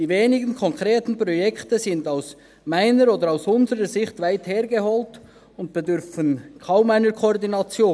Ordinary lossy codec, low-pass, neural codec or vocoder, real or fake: none; none; none; real